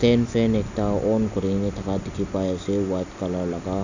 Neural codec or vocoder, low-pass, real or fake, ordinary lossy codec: none; 7.2 kHz; real; none